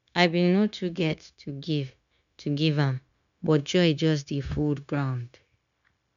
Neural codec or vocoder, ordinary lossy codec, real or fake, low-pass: codec, 16 kHz, 0.9 kbps, LongCat-Audio-Codec; none; fake; 7.2 kHz